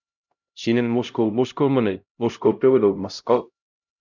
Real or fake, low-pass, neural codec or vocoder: fake; 7.2 kHz; codec, 16 kHz, 0.5 kbps, X-Codec, HuBERT features, trained on LibriSpeech